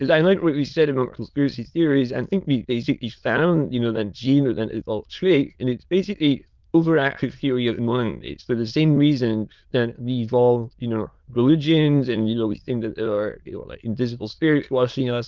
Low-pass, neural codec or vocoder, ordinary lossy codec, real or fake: 7.2 kHz; autoencoder, 22.05 kHz, a latent of 192 numbers a frame, VITS, trained on many speakers; Opus, 24 kbps; fake